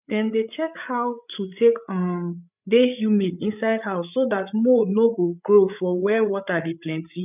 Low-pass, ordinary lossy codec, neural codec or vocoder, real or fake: 3.6 kHz; none; codec, 16 kHz, 8 kbps, FreqCodec, larger model; fake